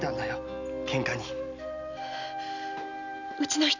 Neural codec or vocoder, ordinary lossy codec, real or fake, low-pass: none; none; real; 7.2 kHz